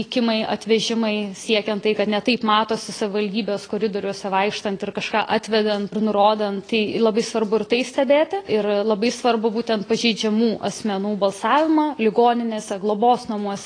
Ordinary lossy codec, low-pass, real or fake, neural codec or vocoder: AAC, 32 kbps; 9.9 kHz; real; none